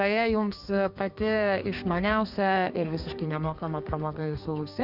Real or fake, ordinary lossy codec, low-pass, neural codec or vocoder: fake; Opus, 64 kbps; 5.4 kHz; codec, 32 kHz, 1.9 kbps, SNAC